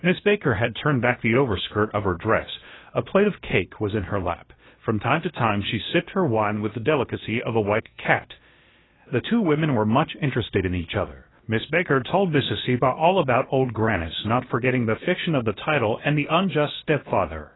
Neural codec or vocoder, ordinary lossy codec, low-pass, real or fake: codec, 16 kHz, about 1 kbps, DyCAST, with the encoder's durations; AAC, 16 kbps; 7.2 kHz; fake